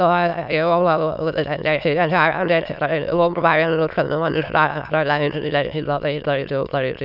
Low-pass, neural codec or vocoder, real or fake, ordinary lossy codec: 5.4 kHz; autoencoder, 22.05 kHz, a latent of 192 numbers a frame, VITS, trained on many speakers; fake; none